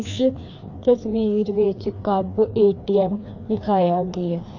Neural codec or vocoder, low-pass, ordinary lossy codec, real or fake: codec, 16 kHz, 2 kbps, FreqCodec, larger model; 7.2 kHz; AAC, 48 kbps; fake